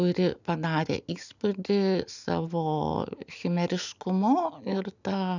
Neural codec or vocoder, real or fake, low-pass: none; real; 7.2 kHz